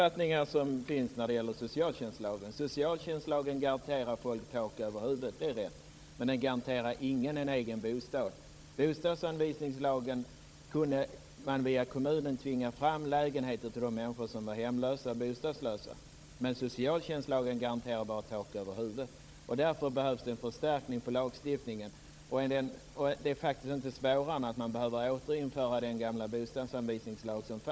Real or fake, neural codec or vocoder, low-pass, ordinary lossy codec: fake; codec, 16 kHz, 16 kbps, FunCodec, trained on Chinese and English, 50 frames a second; none; none